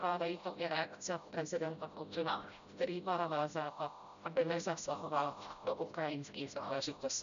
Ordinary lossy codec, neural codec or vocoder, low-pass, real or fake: MP3, 96 kbps; codec, 16 kHz, 0.5 kbps, FreqCodec, smaller model; 7.2 kHz; fake